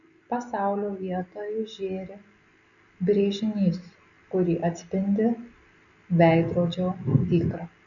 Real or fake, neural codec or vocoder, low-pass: real; none; 7.2 kHz